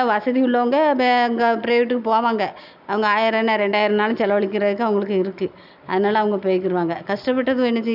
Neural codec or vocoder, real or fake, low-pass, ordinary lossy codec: none; real; 5.4 kHz; none